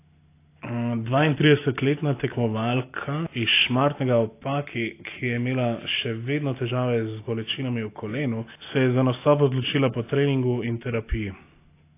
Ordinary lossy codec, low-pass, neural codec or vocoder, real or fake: AAC, 24 kbps; 3.6 kHz; none; real